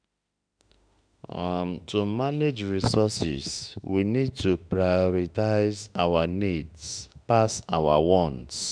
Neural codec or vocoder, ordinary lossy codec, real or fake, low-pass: autoencoder, 48 kHz, 32 numbers a frame, DAC-VAE, trained on Japanese speech; none; fake; 9.9 kHz